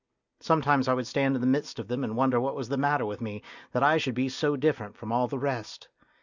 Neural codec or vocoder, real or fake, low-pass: none; real; 7.2 kHz